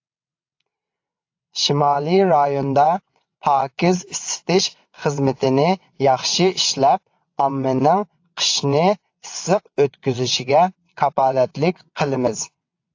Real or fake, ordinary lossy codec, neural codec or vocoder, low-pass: fake; AAC, 48 kbps; vocoder, 22.05 kHz, 80 mel bands, WaveNeXt; 7.2 kHz